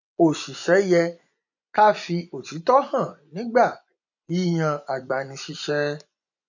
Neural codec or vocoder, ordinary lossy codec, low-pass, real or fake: vocoder, 24 kHz, 100 mel bands, Vocos; none; 7.2 kHz; fake